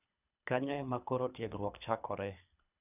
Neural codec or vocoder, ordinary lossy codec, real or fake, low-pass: codec, 24 kHz, 3 kbps, HILCodec; none; fake; 3.6 kHz